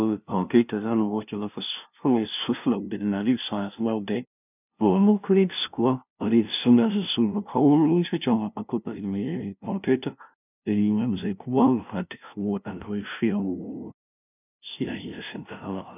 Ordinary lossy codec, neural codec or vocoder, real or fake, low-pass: none; codec, 16 kHz, 0.5 kbps, FunCodec, trained on LibriTTS, 25 frames a second; fake; 3.6 kHz